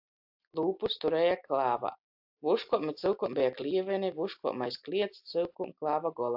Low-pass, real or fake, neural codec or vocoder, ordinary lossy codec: 5.4 kHz; real; none; AAC, 48 kbps